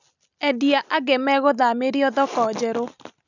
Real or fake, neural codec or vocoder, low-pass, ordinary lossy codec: real; none; 7.2 kHz; none